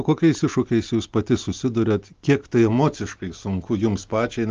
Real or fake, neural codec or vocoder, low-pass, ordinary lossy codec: real; none; 7.2 kHz; Opus, 32 kbps